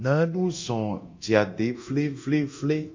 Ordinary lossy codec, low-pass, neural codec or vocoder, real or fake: MP3, 48 kbps; 7.2 kHz; codec, 24 kHz, 0.9 kbps, DualCodec; fake